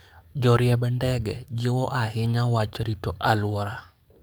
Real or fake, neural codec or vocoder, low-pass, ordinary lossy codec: fake; codec, 44.1 kHz, 7.8 kbps, DAC; none; none